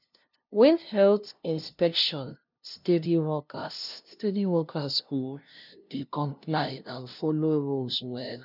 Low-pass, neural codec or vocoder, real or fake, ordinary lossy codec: 5.4 kHz; codec, 16 kHz, 0.5 kbps, FunCodec, trained on LibriTTS, 25 frames a second; fake; none